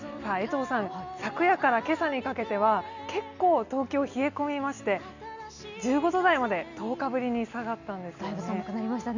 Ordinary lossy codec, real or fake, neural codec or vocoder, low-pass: none; real; none; 7.2 kHz